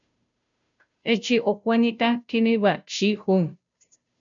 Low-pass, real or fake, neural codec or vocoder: 7.2 kHz; fake; codec, 16 kHz, 0.5 kbps, FunCodec, trained on Chinese and English, 25 frames a second